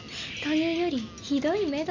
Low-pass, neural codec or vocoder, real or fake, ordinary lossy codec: 7.2 kHz; none; real; none